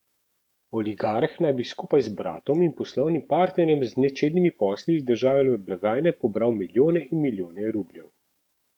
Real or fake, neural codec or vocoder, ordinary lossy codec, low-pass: fake; codec, 44.1 kHz, 7.8 kbps, DAC; MP3, 96 kbps; 19.8 kHz